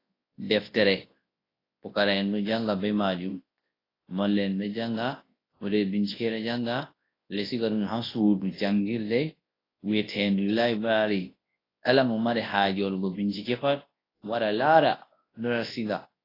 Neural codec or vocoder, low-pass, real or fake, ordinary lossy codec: codec, 24 kHz, 0.9 kbps, WavTokenizer, large speech release; 5.4 kHz; fake; AAC, 24 kbps